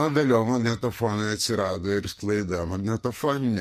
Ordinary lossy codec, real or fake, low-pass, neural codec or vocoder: MP3, 64 kbps; fake; 14.4 kHz; codec, 44.1 kHz, 2.6 kbps, SNAC